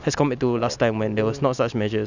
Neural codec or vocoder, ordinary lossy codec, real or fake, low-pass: none; none; real; 7.2 kHz